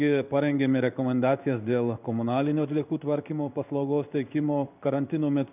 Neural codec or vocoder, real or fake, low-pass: codec, 16 kHz in and 24 kHz out, 1 kbps, XY-Tokenizer; fake; 3.6 kHz